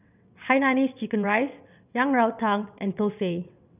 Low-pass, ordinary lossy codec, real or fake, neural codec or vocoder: 3.6 kHz; none; fake; vocoder, 22.05 kHz, 80 mel bands, WaveNeXt